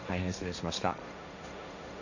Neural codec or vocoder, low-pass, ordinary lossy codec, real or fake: codec, 16 kHz, 1.1 kbps, Voila-Tokenizer; 7.2 kHz; none; fake